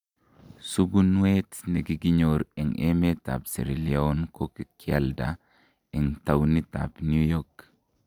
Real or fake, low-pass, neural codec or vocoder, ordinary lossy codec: real; 19.8 kHz; none; none